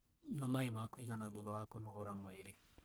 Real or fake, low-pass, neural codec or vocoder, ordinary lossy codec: fake; none; codec, 44.1 kHz, 1.7 kbps, Pupu-Codec; none